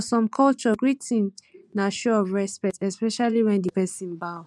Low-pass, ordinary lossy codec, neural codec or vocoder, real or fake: none; none; vocoder, 24 kHz, 100 mel bands, Vocos; fake